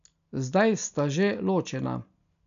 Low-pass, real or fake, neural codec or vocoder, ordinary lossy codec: 7.2 kHz; real; none; none